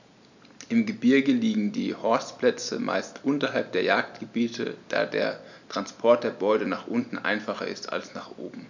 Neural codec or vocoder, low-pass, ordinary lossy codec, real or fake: none; 7.2 kHz; none; real